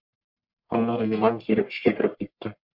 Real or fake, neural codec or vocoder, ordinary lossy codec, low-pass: fake; codec, 44.1 kHz, 1.7 kbps, Pupu-Codec; MP3, 48 kbps; 5.4 kHz